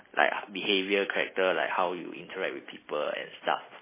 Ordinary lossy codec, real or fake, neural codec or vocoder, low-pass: MP3, 16 kbps; real; none; 3.6 kHz